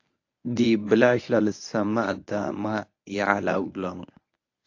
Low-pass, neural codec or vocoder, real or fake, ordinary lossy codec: 7.2 kHz; codec, 24 kHz, 0.9 kbps, WavTokenizer, medium speech release version 1; fake; AAC, 48 kbps